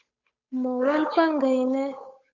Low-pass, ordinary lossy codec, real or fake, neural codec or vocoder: 7.2 kHz; Opus, 64 kbps; fake; codec, 16 kHz, 8 kbps, FunCodec, trained on Chinese and English, 25 frames a second